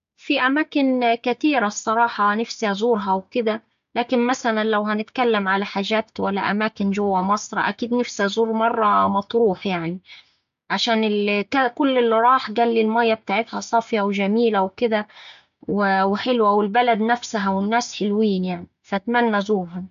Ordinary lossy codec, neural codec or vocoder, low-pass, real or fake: MP3, 64 kbps; codec, 16 kHz, 6 kbps, DAC; 7.2 kHz; fake